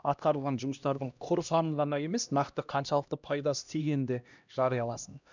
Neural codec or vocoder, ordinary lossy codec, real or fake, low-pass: codec, 16 kHz, 1 kbps, X-Codec, HuBERT features, trained on LibriSpeech; none; fake; 7.2 kHz